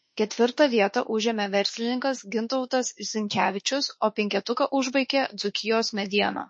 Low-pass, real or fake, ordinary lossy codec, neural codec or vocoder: 9.9 kHz; fake; MP3, 32 kbps; autoencoder, 48 kHz, 32 numbers a frame, DAC-VAE, trained on Japanese speech